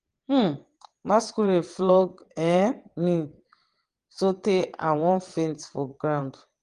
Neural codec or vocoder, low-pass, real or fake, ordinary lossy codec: vocoder, 22.05 kHz, 80 mel bands, WaveNeXt; 9.9 kHz; fake; Opus, 24 kbps